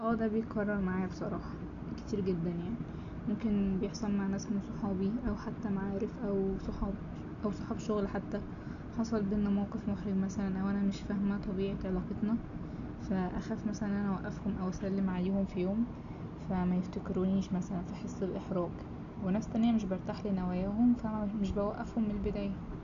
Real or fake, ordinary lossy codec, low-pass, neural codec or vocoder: real; none; 7.2 kHz; none